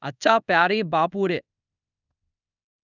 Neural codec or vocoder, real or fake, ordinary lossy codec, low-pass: codec, 24 kHz, 0.5 kbps, DualCodec; fake; none; 7.2 kHz